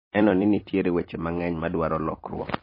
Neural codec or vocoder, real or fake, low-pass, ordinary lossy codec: vocoder, 44.1 kHz, 128 mel bands every 256 samples, BigVGAN v2; fake; 5.4 kHz; MP3, 24 kbps